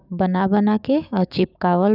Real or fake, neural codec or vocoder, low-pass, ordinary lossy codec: fake; codec, 16 kHz, 6 kbps, DAC; 5.4 kHz; none